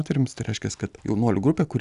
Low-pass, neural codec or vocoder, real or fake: 10.8 kHz; none; real